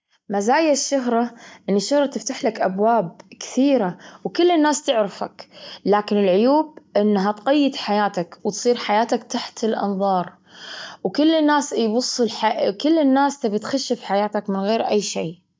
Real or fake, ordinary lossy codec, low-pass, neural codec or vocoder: real; none; none; none